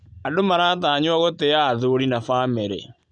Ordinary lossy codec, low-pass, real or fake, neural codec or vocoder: none; 9.9 kHz; real; none